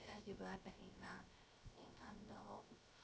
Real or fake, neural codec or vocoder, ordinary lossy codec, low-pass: fake; codec, 16 kHz, 0.3 kbps, FocalCodec; none; none